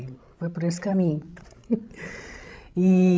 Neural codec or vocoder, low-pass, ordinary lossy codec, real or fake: codec, 16 kHz, 16 kbps, FreqCodec, larger model; none; none; fake